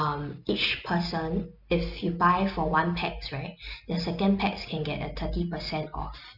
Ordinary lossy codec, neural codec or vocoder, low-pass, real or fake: none; none; 5.4 kHz; real